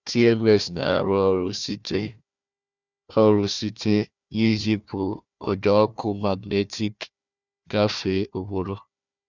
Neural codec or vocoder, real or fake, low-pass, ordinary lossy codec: codec, 16 kHz, 1 kbps, FunCodec, trained on Chinese and English, 50 frames a second; fake; 7.2 kHz; none